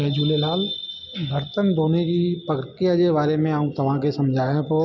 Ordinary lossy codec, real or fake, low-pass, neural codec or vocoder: none; real; 7.2 kHz; none